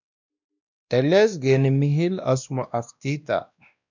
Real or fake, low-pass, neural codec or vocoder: fake; 7.2 kHz; codec, 16 kHz, 1 kbps, X-Codec, WavLM features, trained on Multilingual LibriSpeech